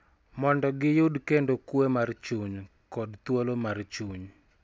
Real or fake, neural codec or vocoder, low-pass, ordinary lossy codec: real; none; none; none